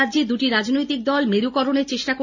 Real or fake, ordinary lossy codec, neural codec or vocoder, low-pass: real; none; none; 7.2 kHz